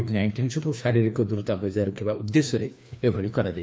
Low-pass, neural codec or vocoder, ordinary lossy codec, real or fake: none; codec, 16 kHz, 2 kbps, FreqCodec, larger model; none; fake